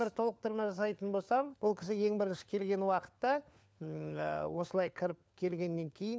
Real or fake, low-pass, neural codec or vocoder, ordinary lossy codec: fake; none; codec, 16 kHz, 4 kbps, FunCodec, trained on LibriTTS, 50 frames a second; none